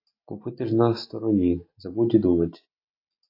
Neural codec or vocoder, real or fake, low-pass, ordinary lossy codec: none; real; 5.4 kHz; AAC, 32 kbps